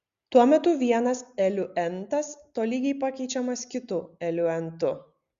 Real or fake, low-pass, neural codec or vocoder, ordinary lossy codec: real; 7.2 kHz; none; Opus, 64 kbps